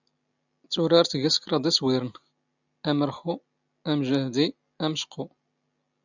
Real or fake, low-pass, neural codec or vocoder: real; 7.2 kHz; none